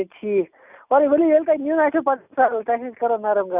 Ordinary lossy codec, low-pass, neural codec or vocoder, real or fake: none; 3.6 kHz; none; real